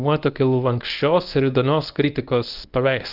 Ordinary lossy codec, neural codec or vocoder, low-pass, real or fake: Opus, 32 kbps; codec, 24 kHz, 0.9 kbps, WavTokenizer, medium speech release version 1; 5.4 kHz; fake